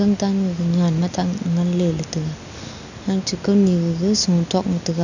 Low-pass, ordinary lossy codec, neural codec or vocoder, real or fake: 7.2 kHz; none; none; real